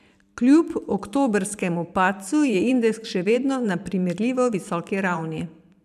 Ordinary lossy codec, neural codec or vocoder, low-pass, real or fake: none; vocoder, 44.1 kHz, 128 mel bands every 512 samples, BigVGAN v2; 14.4 kHz; fake